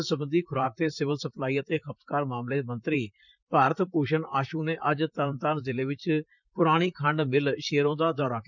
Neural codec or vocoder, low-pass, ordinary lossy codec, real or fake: vocoder, 44.1 kHz, 128 mel bands, Pupu-Vocoder; 7.2 kHz; none; fake